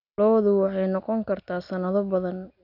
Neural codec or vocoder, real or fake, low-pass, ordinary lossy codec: none; real; 5.4 kHz; none